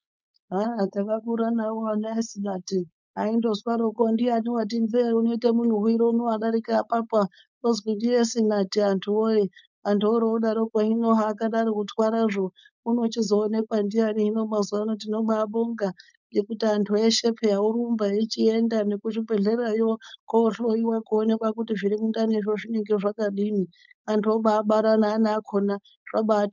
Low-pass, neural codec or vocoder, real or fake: 7.2 kHz; codec, 16 kHz, 4.8 kbps, FACodec; fake